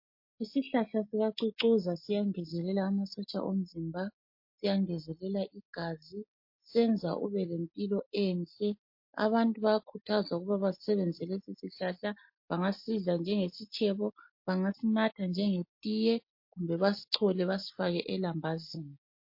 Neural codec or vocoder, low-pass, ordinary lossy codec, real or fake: none; 5.4 kHz; MP3, 32 kbps; real